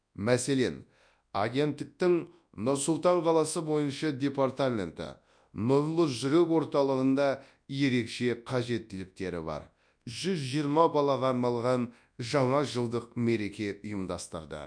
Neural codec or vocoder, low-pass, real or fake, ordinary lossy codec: codec, 24 kHz, 0.9 kbps, WavTokenizer, large speech release; 9.9 kHz; fake; none